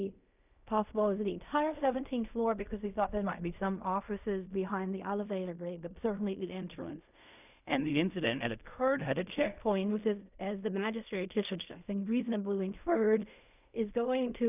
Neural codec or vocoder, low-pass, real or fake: codec, 16 kHz in and 24 kHz out, 0.4 kbps, LongCat-Audio-Codec, fine tuned four codebook decoder; 3.6 kHz; fake